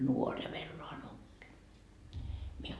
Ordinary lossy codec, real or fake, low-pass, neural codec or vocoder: none; real; none; none